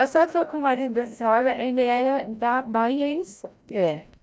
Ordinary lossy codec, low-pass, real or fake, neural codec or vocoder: none; none; fake; codec, 16 kHz, 0.5 kbps, FreqCodec, larger model